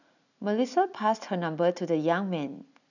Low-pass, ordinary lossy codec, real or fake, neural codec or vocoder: 7.2 kHz; none; real; none